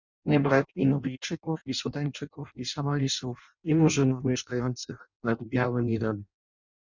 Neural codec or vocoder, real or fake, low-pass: codec, 16 kHz in and 24 kHz out, 0.6 kbps, FireRedTTS-2 codec; fake; 7.2 kHz